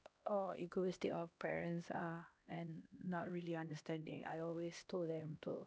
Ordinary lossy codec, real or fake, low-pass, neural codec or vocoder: none; fake; none; codec, 16 kHz, 1 kbps, X-Codec, HuBERT features, trained on LibriSpeech